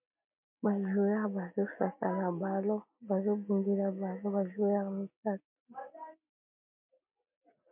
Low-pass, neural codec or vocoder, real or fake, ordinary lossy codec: 3.6 kHz; none; real; MP3, 32 kbps